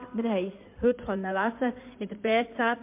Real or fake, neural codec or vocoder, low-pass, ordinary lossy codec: fake; codec, 16 kHz in and 24 kHz out, 2.2 kbps, FireRedTTS-2 codec; 3.6 kHz; MP3, 32 kbps